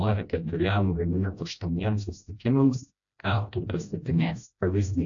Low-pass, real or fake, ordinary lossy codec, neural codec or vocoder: 7.2 kHz; fake; AAC, 64 kbps; codec, 16 kHz, 1 kbps, FreqCodec, smaller model